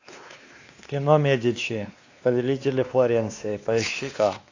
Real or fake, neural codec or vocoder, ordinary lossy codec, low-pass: fake; codec, 16 kHz, 2 kbps, X-Codec, WavLM features, trained on Multilingual LibriSpeech; AAC, 48 kbps; 7.2 kHz